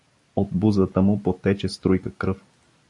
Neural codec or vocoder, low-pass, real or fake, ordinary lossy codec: none; 10.8 kHz; real; AAC, 64 kbps